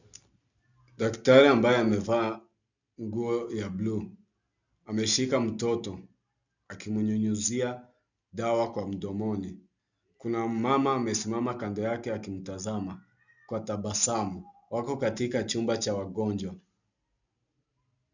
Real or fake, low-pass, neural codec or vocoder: real; 7.2 kHz; none